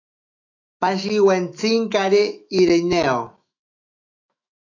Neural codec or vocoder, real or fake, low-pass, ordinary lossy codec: autoencoder, 48 kHz, 128 numbers a frame, DAC-VAE, trained on Japanese speech; fake; 7.2 kHz; AAC, 48 kbps